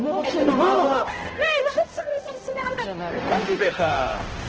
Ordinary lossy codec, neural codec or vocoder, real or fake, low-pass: Opus, 16 kbps; codec, 16 kHz, 0.5 kbps, X-Codec, HuBERT features, trained on balanced general audio; fake; 7.2 kHz